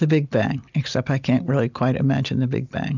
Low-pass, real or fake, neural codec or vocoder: 7.2 kHz; real; none